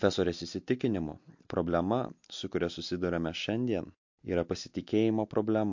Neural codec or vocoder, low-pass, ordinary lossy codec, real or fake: none; 7.2 kHz; MP3, 48 kbps; real